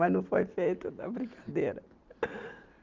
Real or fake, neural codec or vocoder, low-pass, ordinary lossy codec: real; none; 7.2 kHz; Opus, 32 kbps